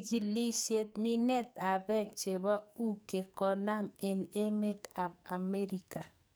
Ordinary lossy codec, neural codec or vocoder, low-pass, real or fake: none; codec, 44.1 kHz, 2.6 kbps, SNAC; none; fake